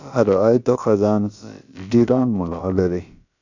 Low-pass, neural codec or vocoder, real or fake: 7.2 kHz; codec, 16 kHz, about 1 kbps, DyCAST, with the encoder's durations; fake